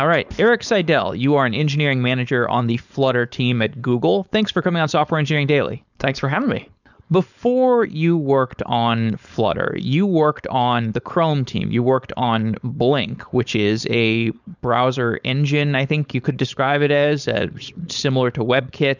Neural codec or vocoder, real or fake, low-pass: codec, 16 kHz, 4.8 kbps, FACodec; fake; 7.2 kHz